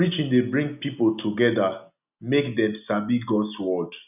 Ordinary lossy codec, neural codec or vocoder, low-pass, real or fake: none; none; 3.6 kHz; real